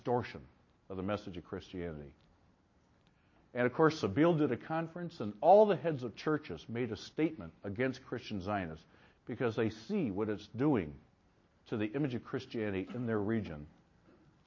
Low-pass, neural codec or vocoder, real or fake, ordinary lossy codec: 7.2 kHz; none; real; MP3, 32 kbps